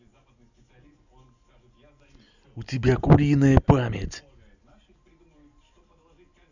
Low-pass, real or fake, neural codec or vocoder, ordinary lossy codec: 7.2 kHz; real; none; none